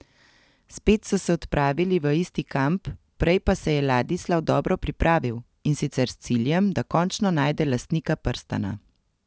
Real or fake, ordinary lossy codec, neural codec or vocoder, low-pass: real; none; none; none